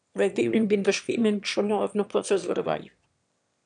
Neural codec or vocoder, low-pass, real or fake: autoencoder, 22.05 kHz, a latent of 192 numbers a frame, VITS, trained on one speaker; 9.9 kHz; fake